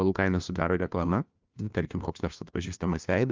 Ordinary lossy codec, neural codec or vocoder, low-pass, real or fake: Opus, 24 kbps; codec, 16 kHz, 1 kbps, FunCodec, trained on LibriTTS, 50 frames a second; 7.2 kHz; fake